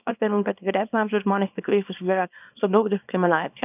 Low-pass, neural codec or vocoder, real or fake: 3.6 kHz; codec, 24 kHz, 0.9 kbps, WavTokenizer, small release; fake